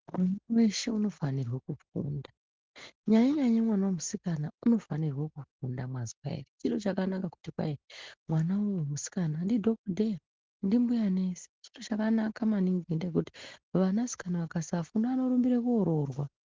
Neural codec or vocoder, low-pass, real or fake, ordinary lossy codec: none; 7.2 kHz; real; Opus, 16 kbps